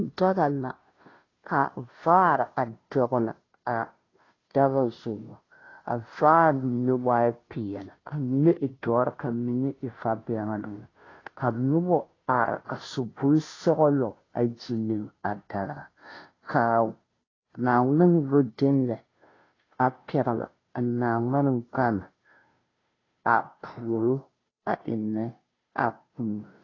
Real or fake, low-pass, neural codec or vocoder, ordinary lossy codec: fake; 7.2 kHz; codec, 16 kHz, 0.5 kbps, FunCodec, trained on Chinese and English, 25 frames a second; AAC, 32 kbps